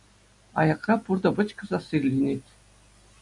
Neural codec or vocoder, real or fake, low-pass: none; real; 10.8 kHz